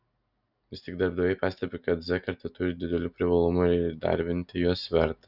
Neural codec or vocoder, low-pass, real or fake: none; 5.4 kHz; real